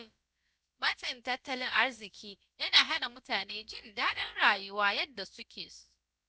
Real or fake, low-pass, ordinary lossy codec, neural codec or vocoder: fake; none; none; codec, 16 kHz, about 1 kbps, DyCAST, with the encoder's durations